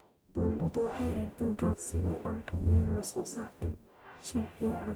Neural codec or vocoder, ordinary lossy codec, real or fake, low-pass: codec, 44.1 kHz, 0.9 kbps, DAC; none; fake; none